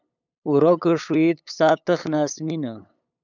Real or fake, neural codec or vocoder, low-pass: fake; codec, 16 kHz, 8 kbps, FunCodec, trained on LibriTTS, 25 frames a second; 7.2 kHz